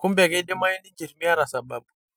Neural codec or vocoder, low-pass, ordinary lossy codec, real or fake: none; none; none; real